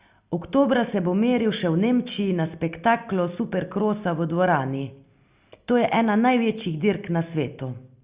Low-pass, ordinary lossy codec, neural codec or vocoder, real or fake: 3.6 kHz; Opus, 64 kbps; none; real